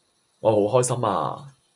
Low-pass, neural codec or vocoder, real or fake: 10.8 kHz; none; real